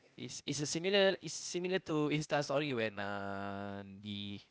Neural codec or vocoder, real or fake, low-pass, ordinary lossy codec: codec, 16 kHz, 0.8 kbps, ZipCodec; fake; none; none